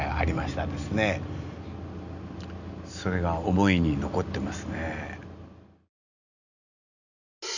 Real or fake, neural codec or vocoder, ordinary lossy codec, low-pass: real; none; none; 7.2 kHz